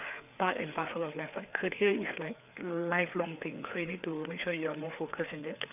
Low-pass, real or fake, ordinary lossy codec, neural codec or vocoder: 3.6 kHz; fake; none; codec, 16 kHz, 4 kbps, FreqCodec, larger model